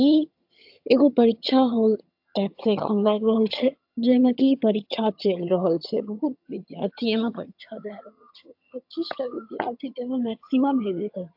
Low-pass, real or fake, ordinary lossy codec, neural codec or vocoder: 5.4 kHz; fake; AAC, 48 kbps; vocoder, 22.05 kHz, 80 mel bands, HiFi-GAN